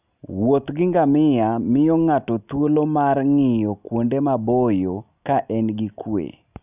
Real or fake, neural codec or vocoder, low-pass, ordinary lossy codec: real; none; 3.6 kHz; none